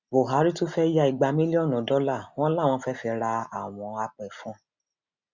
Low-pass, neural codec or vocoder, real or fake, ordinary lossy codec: 7.2 kHz; none; real; Opus, 64 kbps